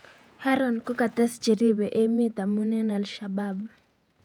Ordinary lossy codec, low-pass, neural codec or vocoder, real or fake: none; 19.8 kHz; vocoder, 48 kHz, 128 mel bands, Vocos; fake